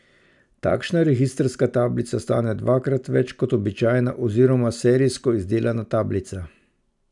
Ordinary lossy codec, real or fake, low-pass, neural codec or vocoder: none; real; 10.8 kHz; none